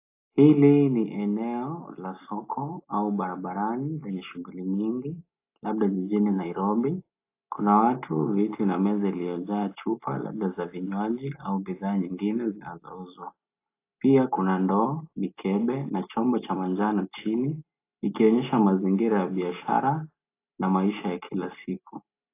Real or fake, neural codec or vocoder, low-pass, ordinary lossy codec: real; none; 3.6 kHz; AAC, 24 kbps